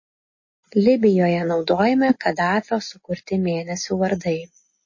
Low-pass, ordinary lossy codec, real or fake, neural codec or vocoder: 7.2 kHz; MP3, 32 kbps; real; none